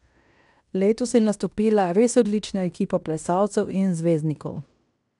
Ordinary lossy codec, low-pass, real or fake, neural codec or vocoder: none; 10.8 kHz; fake; codec, 16 kHz in and 24 kHz out, 0.9 kbps, LongCat-Audio-Codec, fine tuned four codebook decoder